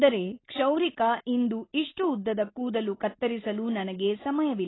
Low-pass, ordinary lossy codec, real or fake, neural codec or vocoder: 7.2 kHz; AAC, 16 kbps; real; none